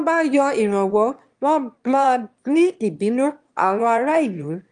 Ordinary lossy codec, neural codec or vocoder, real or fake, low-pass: Opus, 32 kbps; autoencoder, 22.05 kHz, a latent of 192 numbers a frame, VITS, trained on one speaker; fake; 9.9 kHz